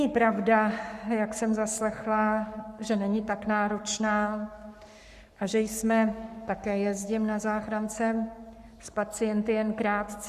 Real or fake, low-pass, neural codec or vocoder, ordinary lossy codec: fake; 14.4 kHz; codec, 44.1 kHz, 7.8 kbps, Pupu-Codec; Opus, 64 kbps